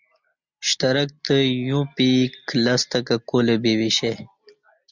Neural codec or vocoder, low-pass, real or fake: none; 7.2 kHz; real